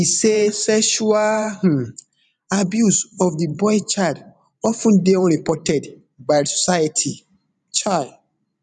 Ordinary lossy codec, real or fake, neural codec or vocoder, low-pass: none; fake; vocoder, 44.1 kHz, 128 mel bands every 512 samples, BigVGAN v2; 10.8 kHz